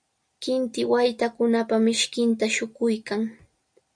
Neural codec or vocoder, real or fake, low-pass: none; real; 9.9 kHz